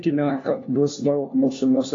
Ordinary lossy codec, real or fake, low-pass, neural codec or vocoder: AAC, 32 kbps; fake; 7.2 kHz; codec, 16 kHz, 1 kbps, FunCodec, trained on LibriTTS, 50 frames a second